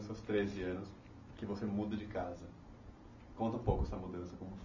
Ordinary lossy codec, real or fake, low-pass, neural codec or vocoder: none; real; 7.2 kHz; none